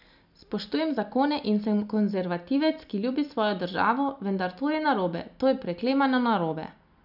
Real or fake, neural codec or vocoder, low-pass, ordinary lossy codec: real; none; 5.4 kHz; none